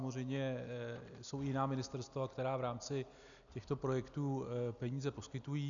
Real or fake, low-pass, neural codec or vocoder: real; 7.2 kHz; none